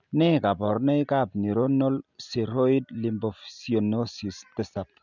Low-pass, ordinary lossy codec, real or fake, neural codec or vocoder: 7.2 kHz; none; real; none